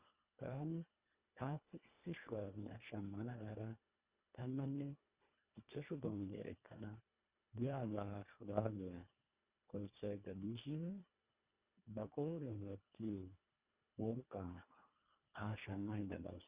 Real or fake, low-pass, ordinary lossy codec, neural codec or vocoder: fake; 3.6 kHz; Opus, 64 kbps; codec, 24 kHz, 1.5 kbps, HILCodec